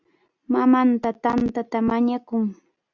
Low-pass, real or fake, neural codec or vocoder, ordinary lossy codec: 7.2 kHz; real; none; Opus, 64 kbps